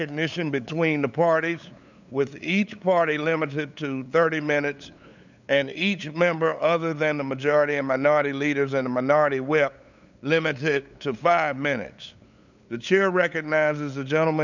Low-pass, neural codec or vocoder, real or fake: 7.2 kHz; codec, 16 kHz, 8 kbps, FunCodec, trained on LibriTTS, 25 frames a second; fake